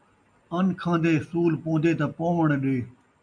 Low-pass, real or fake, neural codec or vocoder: 9.9 kHz; real; none